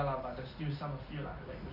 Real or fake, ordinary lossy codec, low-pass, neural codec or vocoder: real; none; 5.4 kHz; none